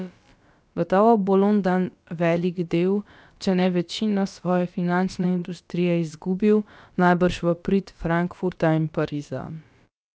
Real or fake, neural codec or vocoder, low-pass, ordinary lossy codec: fake; codec, 16 kHz, about 1 kbps, DyCAST, with the encoder's durations; none; none